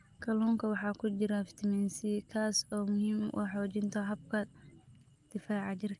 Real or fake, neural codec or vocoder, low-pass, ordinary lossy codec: real; none; 10.8 kHz; Opus, 32 kbps